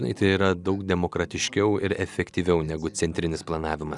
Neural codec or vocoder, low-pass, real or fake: none; 10.8 kHz; real